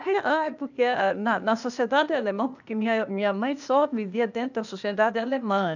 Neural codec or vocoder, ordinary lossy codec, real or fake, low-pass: codec, 16 kHz, 0.8 kbps, ZipCodec; none; fake; 7.2 kHz